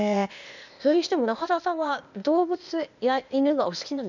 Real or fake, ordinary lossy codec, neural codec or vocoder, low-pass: fake; none; codec, 16 kHz, 0.8 kbps, ZipCodec; 7.2 kHz